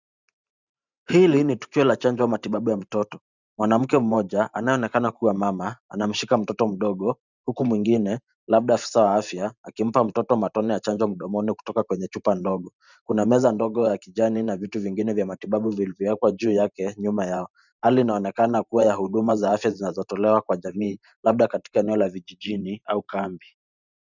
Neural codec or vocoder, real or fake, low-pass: vocoder, 44.1 kHz, 128 mel bands every 512 samples, BigVGAN v2; fake; 7.2 kHz